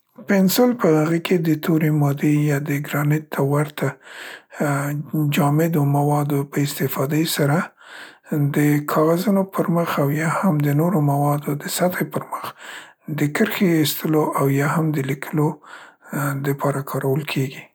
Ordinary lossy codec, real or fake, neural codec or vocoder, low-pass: none; real; none; none